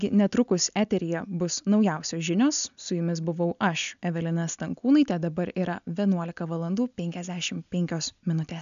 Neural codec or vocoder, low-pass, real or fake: none; 7.2 kHz; real